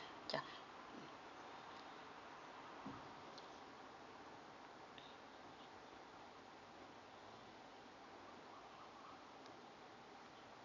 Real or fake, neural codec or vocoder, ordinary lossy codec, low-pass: real; none; none; 7.2 kHz